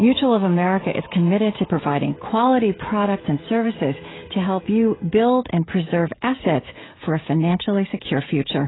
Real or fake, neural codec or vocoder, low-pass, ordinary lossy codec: fake; codec, 16 kHz, 16 kbps, FreqCodec, smaller model; 7.2 kHz; AAC, 16 kbps